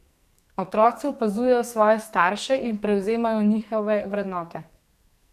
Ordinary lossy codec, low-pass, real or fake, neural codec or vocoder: none; 14.4 kHz; fake; codec, 44.1 kHz, 2.6 kbps, SNAC